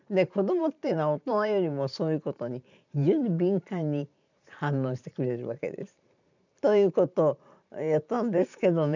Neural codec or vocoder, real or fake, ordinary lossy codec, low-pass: vocoder, 44.1 kHz, 128 mel bands every 512 samples, BigVGAN v2; fake; none; 7.2 kHz